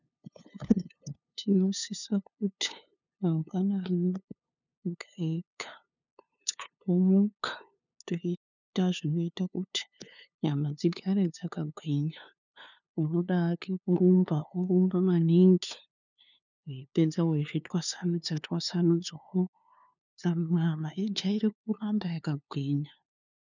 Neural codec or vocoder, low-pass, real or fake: codec, 16 kHz, 2 kbps, FunCodec, trained on LibriTTS, 25 frames a second; 7.2 kHz; fake